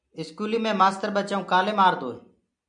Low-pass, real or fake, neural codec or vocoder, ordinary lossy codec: 9.9 kHz; real; none; MP3, 96 kbps